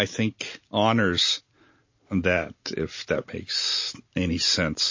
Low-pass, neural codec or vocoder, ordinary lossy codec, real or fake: 7.2 kHz; none; MP3, 32 kbps; real